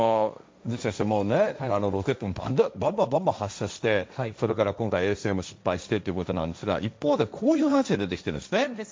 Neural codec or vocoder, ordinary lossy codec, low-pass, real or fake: codec, 16 kHz, 1.1 kbps, Voila-Tokenizer; none; none; fake